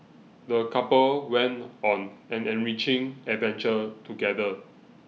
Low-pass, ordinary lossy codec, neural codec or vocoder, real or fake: none; none; none; real